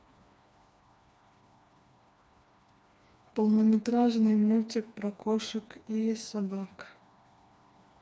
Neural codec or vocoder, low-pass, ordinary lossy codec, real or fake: codec, 16 kHz, 2 kbps, FreqCodec, smaller model; none; none; fake